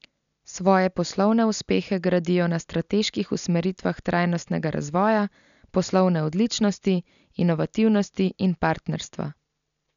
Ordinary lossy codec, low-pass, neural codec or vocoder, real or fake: none; 7.2 kHz; none; real